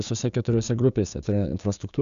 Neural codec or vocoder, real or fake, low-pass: codec, 16 kHz, 6 kbps, DAC; fake; 7.2 kHz